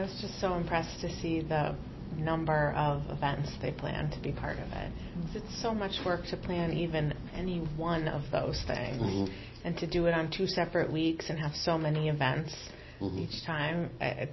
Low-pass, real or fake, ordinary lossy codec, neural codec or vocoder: 7.2 kHz; real; MP3, 24 kbps; none